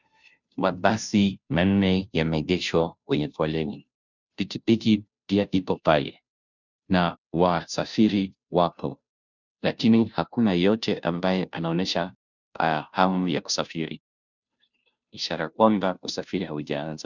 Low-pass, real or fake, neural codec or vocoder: 7.2 kHz; fake; codec, 16 kHz, 0.5 kbps, FunCodec, trained on Chinese and English, 25 frames a second